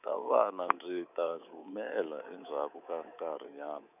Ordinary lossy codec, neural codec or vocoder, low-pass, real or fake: none; codec, 24 kHz, 3.1 kbps, DualCodec; 3.6 kHz; fake